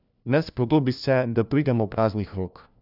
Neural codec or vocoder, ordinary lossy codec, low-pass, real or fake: codec, 16 kHz, 1 kbps, FunCodec, trained on LibriTTS, 50 frames a second; none; 5.4 kHz; fake